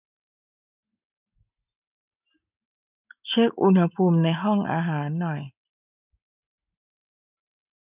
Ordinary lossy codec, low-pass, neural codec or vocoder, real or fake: none; 3.6 kHz; none; real